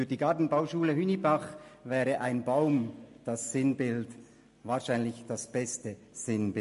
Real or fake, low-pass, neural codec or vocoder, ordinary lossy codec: real; 14.4 kHz; none; MP3, 48 kbps